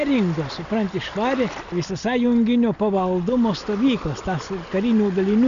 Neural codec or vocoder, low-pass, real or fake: none; 7.2 kHz; real